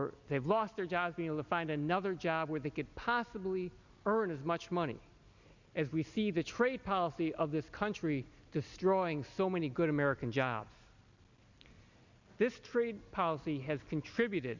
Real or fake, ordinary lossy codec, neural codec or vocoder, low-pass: real; Opus, 64 kbps; none; 7.2 kHz